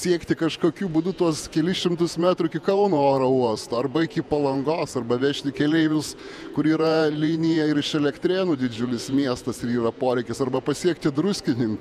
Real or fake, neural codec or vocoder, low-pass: fake; vocoder, 48 kHz, 128 mel bands, Vocos; 14.4 kHz